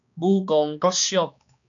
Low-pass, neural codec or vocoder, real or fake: 7.2 kHz; codec, 16 kHz, 2 kbps, X-Codec, HuBERT features, trained on balanced general audio; fake